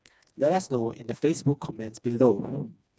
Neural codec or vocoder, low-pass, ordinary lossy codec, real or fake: codec, 16 kHz, 2 kbps, FreqCodec, smaller model; none; none; fake